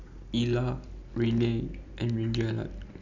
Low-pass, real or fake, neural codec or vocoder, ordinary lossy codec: 7.2 kHz; real; none; none